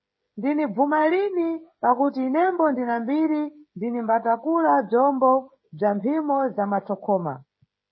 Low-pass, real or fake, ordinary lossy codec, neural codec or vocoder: 7.2 kHz; fake; MP3, 24 kbps; codec, 16 kHz, 16 kbps, FreqCodec, smaller model